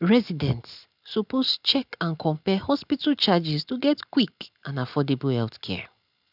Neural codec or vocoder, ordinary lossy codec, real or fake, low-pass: none; none; real; 5.4 kHz